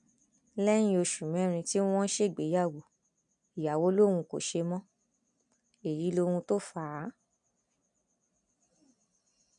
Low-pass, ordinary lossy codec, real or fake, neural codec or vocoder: 9.9 kHz; none; real; none